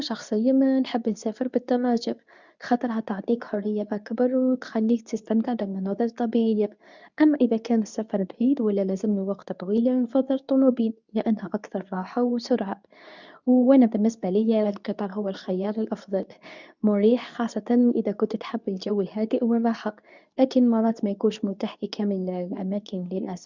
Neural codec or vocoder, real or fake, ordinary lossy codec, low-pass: codec, 24 kHz, 0.9 kbps, WavTokenizer, medium speech release version 2; fake; none; 7.2 kHz